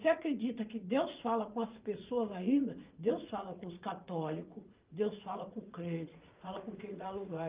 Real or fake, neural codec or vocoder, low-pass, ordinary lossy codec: fake; vocoder, 44.1 kHz, 80 mel bands, Vocos; 3.6 kHz; Opus, 24 kbps